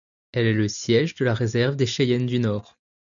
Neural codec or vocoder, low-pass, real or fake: none; 7.2 kHz; real